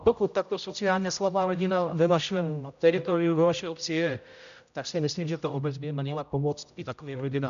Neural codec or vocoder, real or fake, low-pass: codec, 16 kHz, 0.5 kbps, X-Codec, HuBERT features, trained on general audio; fake; 7.2 kHz